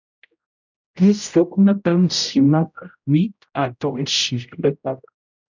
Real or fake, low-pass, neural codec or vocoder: fake; 7.2 kHz; codec, 16 kHz, 0.5 kbps, X-Codec, HuBERT features, trained on general audio